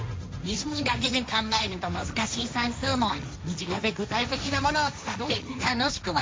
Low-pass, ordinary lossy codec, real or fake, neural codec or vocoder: none; none; fake; codec, 16 kHz, 1.1 kbps, Voila-Tokenizer